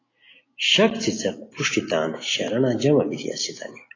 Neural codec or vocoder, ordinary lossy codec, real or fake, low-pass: none; AAC, 32 kbps; real; 7.2 kHz